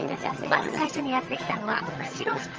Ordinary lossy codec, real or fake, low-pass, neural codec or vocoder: Opus, 24 kbps; fake; 7.2 kHz; vocoder, 22.05 kHz, 80 mel bands, HiFi-GAN